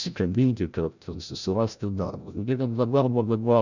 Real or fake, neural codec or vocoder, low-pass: fake; codec, 16 kHz, 0.5 kbps, FreqCodec, larger model; 7.2 kHz